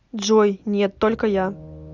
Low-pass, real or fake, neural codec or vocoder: 7.2 kHz; real; none